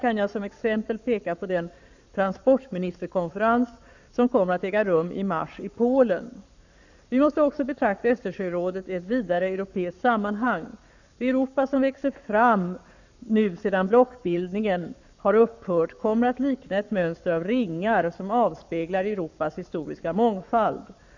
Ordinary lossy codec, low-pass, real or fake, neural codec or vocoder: none; 7.2 kHz; fake; codec, 44.1 kHz, 7.8 kbps, Pupu-Codec